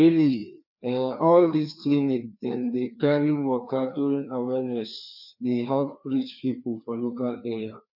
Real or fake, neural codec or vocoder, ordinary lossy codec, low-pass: fake; codec, 16 kHz, 2 kbps, FreqCodec, larger model; none; 5.4 kHz